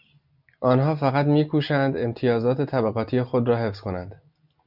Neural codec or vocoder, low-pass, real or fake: none; 5.4 kHz; real